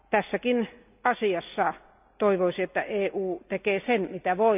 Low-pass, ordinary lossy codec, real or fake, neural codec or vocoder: 3.6 kHz; none; real; none